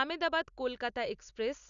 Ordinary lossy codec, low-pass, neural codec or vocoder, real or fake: none; 7.2 kHz; none; real